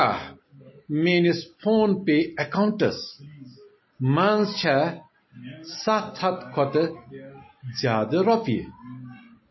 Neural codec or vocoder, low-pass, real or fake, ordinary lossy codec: none; 7.2 kHz; real; MP3, 24 kbps